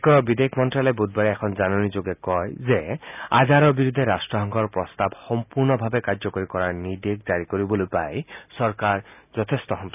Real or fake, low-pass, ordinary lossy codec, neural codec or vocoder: real; 3.6 kHz; none; none